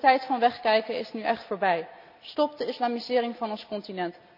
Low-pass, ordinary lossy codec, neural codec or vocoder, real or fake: 5.4 kHz; none; none; real